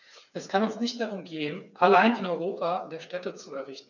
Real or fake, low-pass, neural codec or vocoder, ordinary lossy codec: fake; 7.2 kHz; codec, 16 kHz, 4 kbps, FreqCodec, smaller model; none